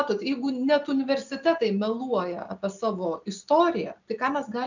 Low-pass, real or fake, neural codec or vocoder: 7.2 kHz; real; none